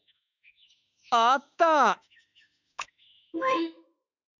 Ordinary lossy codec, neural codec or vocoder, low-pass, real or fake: none; autoencoder, 48 kHz, 32 numbers a frame, DAC-VAE, trained on Japanese speech; 7.2 kHz; fake